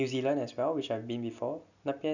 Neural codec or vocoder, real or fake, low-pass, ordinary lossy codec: none; real; 7.2 kHz; none